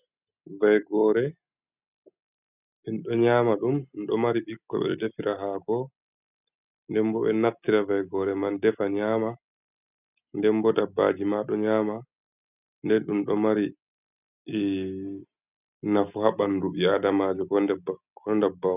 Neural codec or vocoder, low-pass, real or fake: none; 3.6 kHz; real